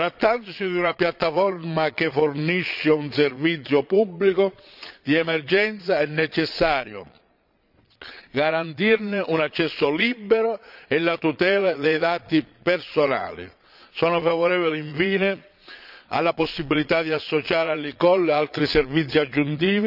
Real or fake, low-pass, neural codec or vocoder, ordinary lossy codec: fake; 5.4 kHz; vocoder, 22.05 kHz, 80 mel bands, Vocos; none